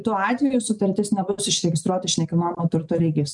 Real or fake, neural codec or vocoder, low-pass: real; none; 10.8 kHz